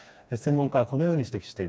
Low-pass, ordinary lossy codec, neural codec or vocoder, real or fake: none; none; codec, 16 kHz, 2 kbps, FreqCodec, smaller model; fake